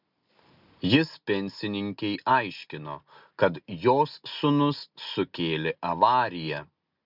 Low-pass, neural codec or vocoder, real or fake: 5.4 kHz; none; real